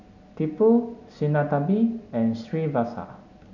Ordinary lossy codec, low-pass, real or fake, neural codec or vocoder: none; 7.2 kHz; real; none